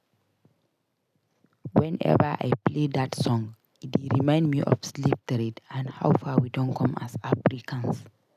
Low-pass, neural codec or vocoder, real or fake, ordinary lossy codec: 14.4 kHz; none; real; none